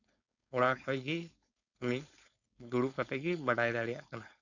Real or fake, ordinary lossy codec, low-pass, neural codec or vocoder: fake; none; 7.2 kHz; codec, 16 kHz, 4.8 kbps, FACodec